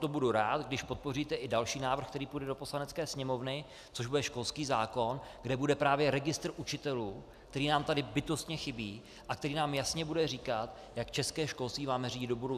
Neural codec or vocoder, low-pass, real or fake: none; 14.4 kHz; real